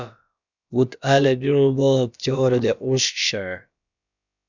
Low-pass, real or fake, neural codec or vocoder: 7.2 kHz; fake; codec, 16 kHz, about 1 kbps, DyCAST, with the encoder's durations